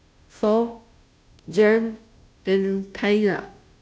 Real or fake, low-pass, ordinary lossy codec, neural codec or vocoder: fake; none; none; codec, 16 kHz, 0.5 kbps, FunCodec, trained on Chinese and English, 25 frames a second